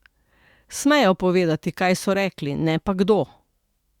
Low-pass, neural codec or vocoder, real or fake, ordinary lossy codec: 19.8 kHz; autoencoder, 48 kHz, 128 numbers a frame, DAC-VAE, trained on Japanese speech; fake; Opus, 64 kbps